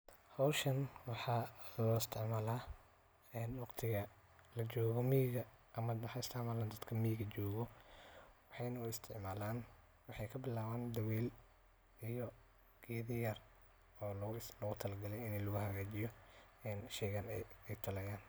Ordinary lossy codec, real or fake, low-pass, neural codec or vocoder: none; real; none; none